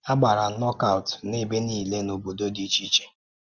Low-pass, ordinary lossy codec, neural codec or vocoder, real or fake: 7.2 kHz; Opus, 24 kbps; none; real